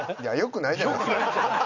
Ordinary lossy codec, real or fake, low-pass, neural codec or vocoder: none; real; 7.2 kHz; none